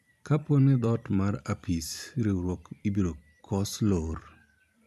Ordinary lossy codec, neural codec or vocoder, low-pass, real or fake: none; vocoder, 44.1 kHz, 128 mel bands every 512 samples, BigVGAN v2; 14.4 kHz; fake